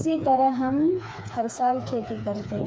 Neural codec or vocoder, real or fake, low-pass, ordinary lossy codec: codec, 16 kHz, 4 kbps, FreqCodec, smaller model; fake; none; none